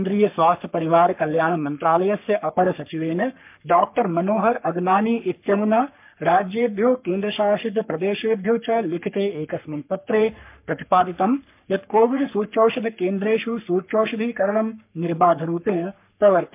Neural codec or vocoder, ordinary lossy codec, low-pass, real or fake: codec, 44.1 kHz, 3.4 kbps, Pupu-Codec; MP3, 32 kbps; 3.6 kHz; fake